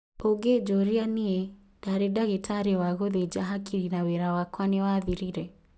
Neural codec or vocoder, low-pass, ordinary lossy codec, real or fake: none; none; none; real